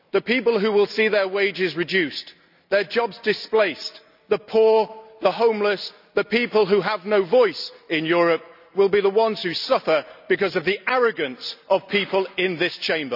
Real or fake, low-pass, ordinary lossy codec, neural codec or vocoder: real; 5.4 kHz; none; none